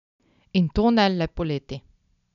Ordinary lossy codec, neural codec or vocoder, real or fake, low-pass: none; none; real; 7.2 kHz